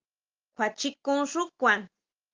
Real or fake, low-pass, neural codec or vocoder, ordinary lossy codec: real; 7.2 kHz; none; Opus, 32 kbps